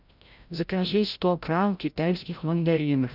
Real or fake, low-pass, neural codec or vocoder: fake; 5.4 kHz; codec, 16 kHz, 0.5 kbps, FreqCodec, larger model